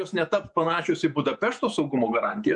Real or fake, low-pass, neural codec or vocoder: fake; 10.8 kHz; vocoder, 48 kHz, 128 mel bands, Vocos